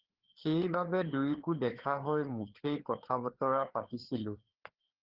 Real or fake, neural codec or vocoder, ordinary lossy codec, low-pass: fake; codec, 16 kHz, 4 kbps, FreqCodec, larger model; Opus, 16 kbps; 5.4 kHz